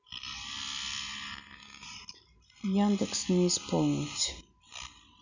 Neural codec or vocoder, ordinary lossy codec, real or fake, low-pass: none; none; real; 7.2 kHz